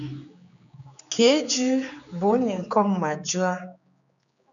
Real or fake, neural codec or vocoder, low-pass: fake; codec, 16 kHz, 4 kbps, X-Codec, HuBERT features, trained on general audio; 7.2 kHz